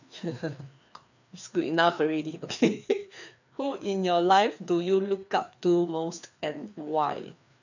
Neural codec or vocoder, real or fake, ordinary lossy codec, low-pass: codec, 16 kHz, 2 kbps, FreqCodec, larger model; fake; none; 7.2 kHz